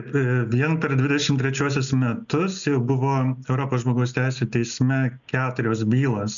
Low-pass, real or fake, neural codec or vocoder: 7.2 kHz; real; none